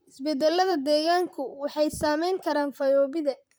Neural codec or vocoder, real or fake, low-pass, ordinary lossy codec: vocoder, 44.1 kHz, 128 mel bands, Pupu-Vocoder; fake; none; none